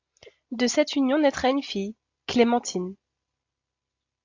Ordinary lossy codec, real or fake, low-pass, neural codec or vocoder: AAC, 48 kbps; real; 7.2 kHz; none